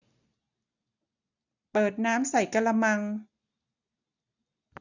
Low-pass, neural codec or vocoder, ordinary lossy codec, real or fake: 7.2 kHz; none; none; real